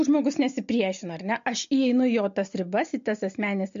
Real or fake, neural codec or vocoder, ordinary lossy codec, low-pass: real; none; MP3, 48 kbps; 7.2 kHz